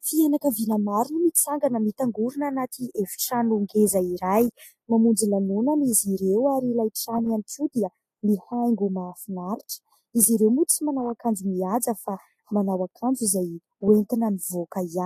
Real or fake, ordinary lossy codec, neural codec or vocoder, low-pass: real; AAC, 48 kbps; none; 14.4 kHz